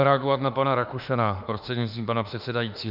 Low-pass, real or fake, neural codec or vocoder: 5.4 kHz; fake; autoencoder, 48 kHz, 32 numbers a frame, DAC-VAE, trained on Japanese speech